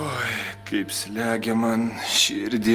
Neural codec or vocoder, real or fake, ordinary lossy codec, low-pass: none; real; Opus, 24 kbps; 14.4 kHz